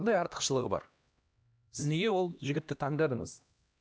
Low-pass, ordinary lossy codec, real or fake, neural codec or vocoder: none; none; fake; codec, 16 kHz, 1 kbps, X-Codec, HuBERT features, trained on LibriSpeech